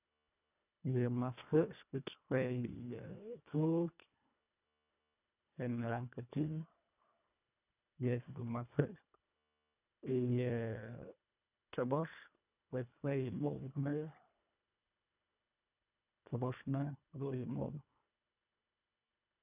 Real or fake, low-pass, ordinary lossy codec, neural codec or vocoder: fake; 3.6 kHz; none; codec, 24 kHz, 1.5 kbps, HILCodec